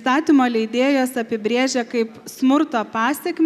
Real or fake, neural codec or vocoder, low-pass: real; none; 14.4 kHz